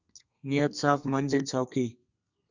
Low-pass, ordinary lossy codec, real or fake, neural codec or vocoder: 7.2 kHz; Opus, 64 kbps; fake; codec, 32 kHz, 1.9 kbps, SNAC